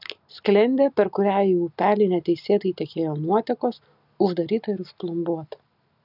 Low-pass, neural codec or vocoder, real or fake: 5.4 kHz; none; real